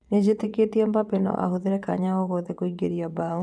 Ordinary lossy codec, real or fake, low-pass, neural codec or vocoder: none; fake; none; vocoder, 22.05 kHz, 80 mel bands, WaveNeXt